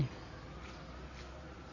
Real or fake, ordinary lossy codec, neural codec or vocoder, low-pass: real; none; none; 7.2 kHz